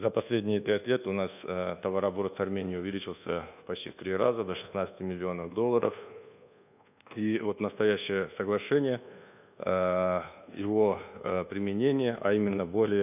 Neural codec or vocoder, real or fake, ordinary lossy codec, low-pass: codec, 24 kHz, 1.2 kbps, DualCodec; fake; none; 3.6 kHz